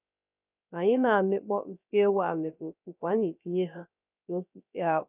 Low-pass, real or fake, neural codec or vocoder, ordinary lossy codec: 3.6 kHz; fake; codec, 16 kHz, 0.3 kbps, FocalCodec; none